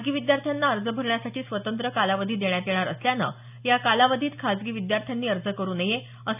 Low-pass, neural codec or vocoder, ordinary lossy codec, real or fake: 3.6 kHz; none; none; real